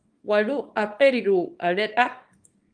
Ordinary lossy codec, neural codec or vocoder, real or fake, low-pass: Opus, 32 kbps; codec, 24 kHz, 0.9 kbps, WavTokenizer, small release; fake; 9.9 kHz